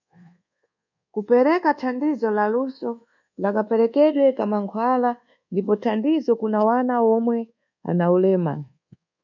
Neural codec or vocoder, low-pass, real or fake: codec, 24 kHz, 1.2 kbps, DualCodec; 7.2 kHz; fake